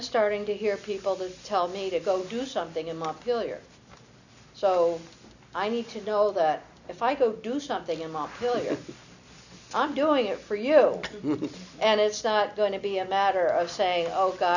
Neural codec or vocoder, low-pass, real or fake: none; 7.2 kHz; real